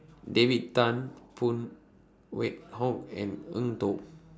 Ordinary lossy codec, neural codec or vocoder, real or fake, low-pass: none; none; real; none